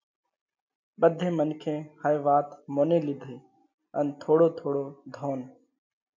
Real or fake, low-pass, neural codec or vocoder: real; 7.2 kHz; none